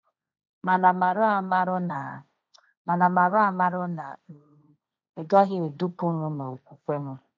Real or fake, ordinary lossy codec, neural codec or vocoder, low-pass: fake; none; codec, 16 kHz, 1.1 kbps, Voila-Tokenizer; none